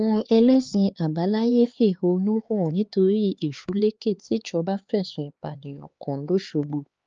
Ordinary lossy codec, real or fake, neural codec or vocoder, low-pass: Opus, 24 kbps; fake; codec, 16 kHz, 4 kbps, X-Codec, HuBERT features, trained on LibriSpeech; 7.2 kHz